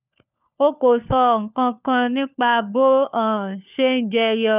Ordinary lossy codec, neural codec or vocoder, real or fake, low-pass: none; codec, 16 kHz, 4 kbps, FunCodec, trained on LibriTTS, 50 frames a second; fake; 3.6 kHz